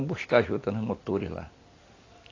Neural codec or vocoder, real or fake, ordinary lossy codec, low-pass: none; real; AAC, 32 kbps; 7.2 kHz